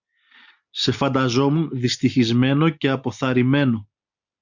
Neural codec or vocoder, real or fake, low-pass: none; real; 7.2 kHz